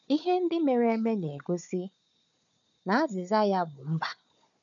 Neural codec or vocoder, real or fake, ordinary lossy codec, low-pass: codec, 16 kHz, 4 kbps, FunCodec, trained on Chinese and English, 50 frames a second; fake; none; 7.2 kHz